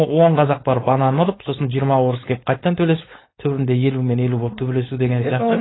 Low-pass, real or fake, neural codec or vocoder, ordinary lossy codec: 7.2 kHz; fake; codec, 16 kHz, 4.8 kbps, FACodec; AAC, 16 kbps